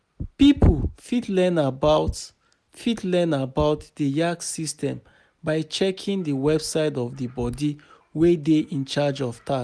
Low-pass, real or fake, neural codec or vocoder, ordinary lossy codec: none; real; none; none